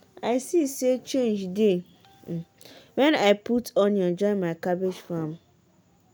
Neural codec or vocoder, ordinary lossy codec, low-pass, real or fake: none; none; none; real